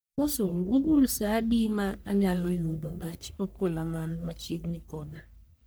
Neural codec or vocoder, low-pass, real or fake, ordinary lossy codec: codec, 44.1 kHz, 1.7 kbps, Pupu-Codec; none; fake; none